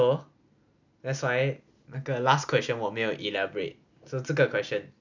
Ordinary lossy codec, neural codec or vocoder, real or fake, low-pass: none; none; real; 7.2 kHz